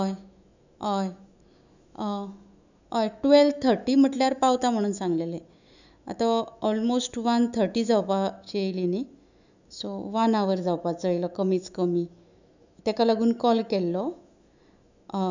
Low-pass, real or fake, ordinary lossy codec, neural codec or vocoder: 7.2 kHz; fake; none; autoencoder, 48 kHz, 128 numbers a frame, DAC-VAE, trained on Japanese speech